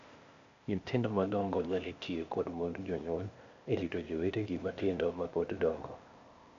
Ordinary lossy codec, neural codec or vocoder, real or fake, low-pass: AAC, 64 kbps; codec, 16 kHz, 0.8 kbps, ZipCodec; fake; 7.2 kHz